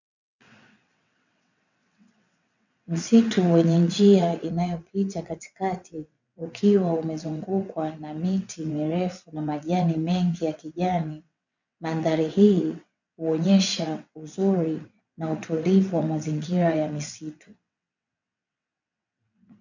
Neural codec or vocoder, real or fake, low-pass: vocoder, 22.05 kHz, 80 mel bands, WaveNeXt; fake; 7.2 kHz